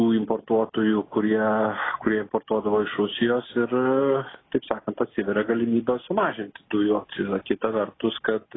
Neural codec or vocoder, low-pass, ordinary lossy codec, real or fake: none; 7.2 kHz; AAC, 16 kbps; real